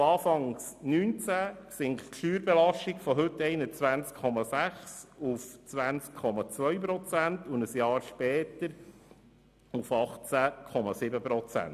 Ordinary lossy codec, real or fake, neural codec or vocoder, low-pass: none; real; none; 14.4 kHz